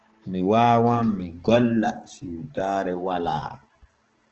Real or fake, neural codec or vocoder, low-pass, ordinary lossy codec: fake; codec, 16 kHz, 4 kbps, X-Codec, HuBERT features, trained on balanced general audio; 7.2 kHz; Opus, 16 kbps